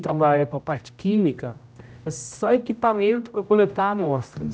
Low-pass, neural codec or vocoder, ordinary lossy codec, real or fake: none; codec, 16 kHz, 0.5 kbps, X-Codec, HuBERT features, trained on general audio; none; fake